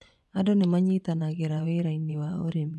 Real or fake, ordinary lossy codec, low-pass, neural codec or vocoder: fake; none; none; vocoder, 24 kHz, 100 mel bands, Vocos